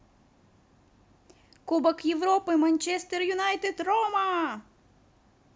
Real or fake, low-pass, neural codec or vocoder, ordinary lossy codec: real; none; none; none